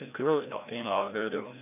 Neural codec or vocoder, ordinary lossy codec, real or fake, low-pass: codec, 16 kHz, 1 kbps, FreqCodec, larger model; none; fake; 3.6 kHz